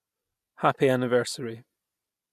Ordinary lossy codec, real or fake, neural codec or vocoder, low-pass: MP3, 96 kbps; real; none; 14.4 kHz